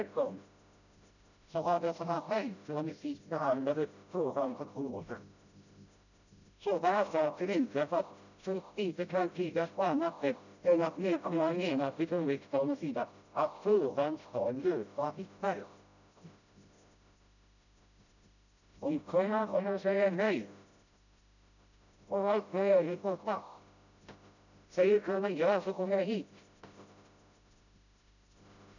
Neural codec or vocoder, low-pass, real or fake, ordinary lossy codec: codec, 16 kHz, 0.5 kbps, FreqCodec, smaller model; 7.2 kHz; fake; none